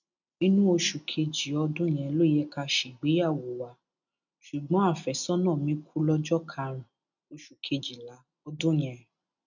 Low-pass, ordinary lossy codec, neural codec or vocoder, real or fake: 7.2 kHz; none; none; real